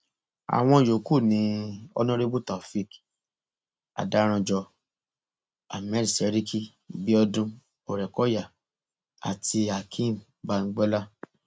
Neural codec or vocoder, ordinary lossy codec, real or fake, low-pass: none; none; real; none